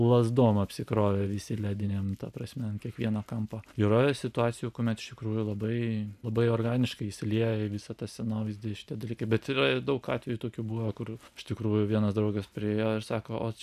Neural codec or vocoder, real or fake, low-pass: none; real; 14.4 kHz